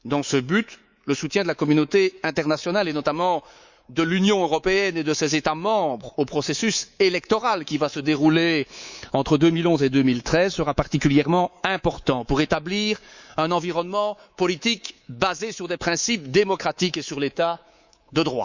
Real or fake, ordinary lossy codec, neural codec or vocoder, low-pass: fake; Opus, 64 kbps; codec, 24 kHz, 3.1 kbps, DualCodec; 7.2 kHz